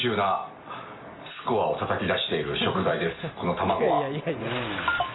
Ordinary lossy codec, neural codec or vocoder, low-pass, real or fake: AAC, 16 kbps; none; 7.2 kHz; real